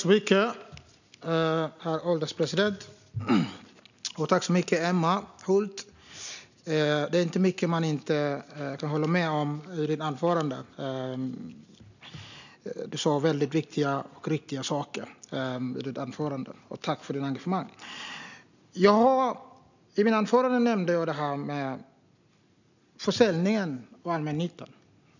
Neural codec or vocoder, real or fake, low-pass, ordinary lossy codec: none; real; 7.2 kHz; none